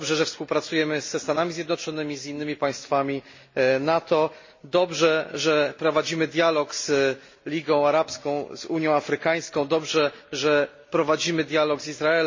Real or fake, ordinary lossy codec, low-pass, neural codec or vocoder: real; MP3, 32 kbps; 7.2 kHz; none